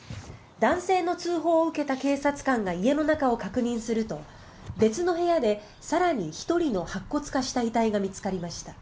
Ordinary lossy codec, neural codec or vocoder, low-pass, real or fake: none; none; none; real